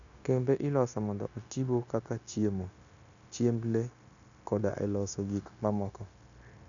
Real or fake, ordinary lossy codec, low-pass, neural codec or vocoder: fake; none; 7.2 kHz; codec, 16 kHz, 0.9 kbps, LongCat-Audio-Codec